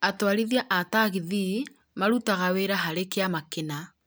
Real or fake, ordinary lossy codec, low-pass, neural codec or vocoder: real; none; none; none